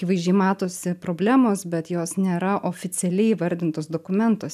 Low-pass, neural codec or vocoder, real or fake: 14.4 kHz; none; real